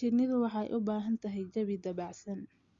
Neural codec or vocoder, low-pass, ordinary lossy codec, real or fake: none; 7.2 kHz; Opus, 64 kbps; real